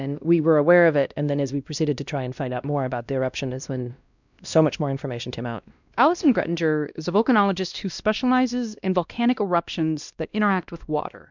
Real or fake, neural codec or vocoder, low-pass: fake; codec, 16 kHz, 1 kbps, X-Codec, WavLM features, trained on Multilingual LibriSpeech; 7.2 kHz